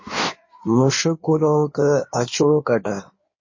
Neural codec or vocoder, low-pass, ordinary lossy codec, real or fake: codec, 16 kHz in and 24 kHz out, 1.1 kbps, FireRedTTS-2 codec; 7.2 kHz; MP3, 32 kbps; fake